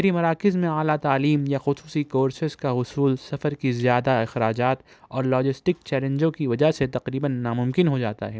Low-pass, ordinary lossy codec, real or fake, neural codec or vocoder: none; none; real; none